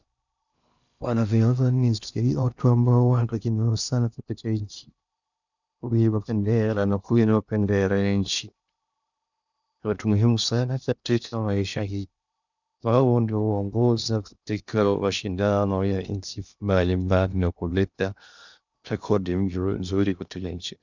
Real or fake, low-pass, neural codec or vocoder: fake; 7.2 kHz; codec, 16 kHz in and 24 kHz out, 0.6 kbps, FocalCodec, streaming, 2048 codes